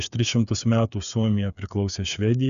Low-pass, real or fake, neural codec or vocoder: 7.2 kHz; fake; codec, 16 kHz, 8 kbps, FreqCodec, smaller model